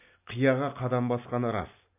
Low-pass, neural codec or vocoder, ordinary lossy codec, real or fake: 3.6 kHz; none; AAC, 32 kbps; real